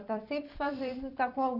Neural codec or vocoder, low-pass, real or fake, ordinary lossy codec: vocoder, 44.1 kHz, 128 mel bands every 256 samples, BigVGAN v2; 5.4 kHz; fake; none